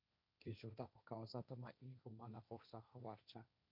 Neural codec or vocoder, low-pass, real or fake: codec, 16 kHz, 1.1 kbps, Voila-Tokenizer; 5.4 kHz; fake